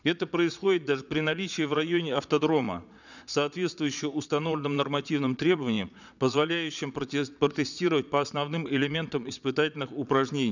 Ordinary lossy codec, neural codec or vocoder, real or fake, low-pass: none; vocoder, 22.05 kHz, 80 mel bands, Vocos; fake; 7.2 kHz